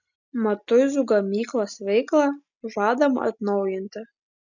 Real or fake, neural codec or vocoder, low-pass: real; none; 7.2 kHz